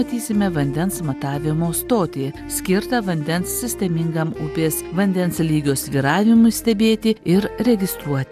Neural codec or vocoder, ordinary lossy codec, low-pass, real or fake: none; AAC, 96 kbps; 14.4 kHz; real